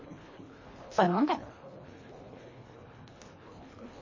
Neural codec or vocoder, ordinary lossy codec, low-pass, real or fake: codec, 24 kHz, 1.5 kbps, HILCodec; MP3, 32 kbps; 7.2 kHz; fake